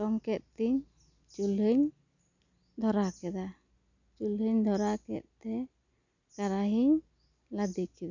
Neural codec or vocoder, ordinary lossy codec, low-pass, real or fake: none; none; 7.2 kHz; real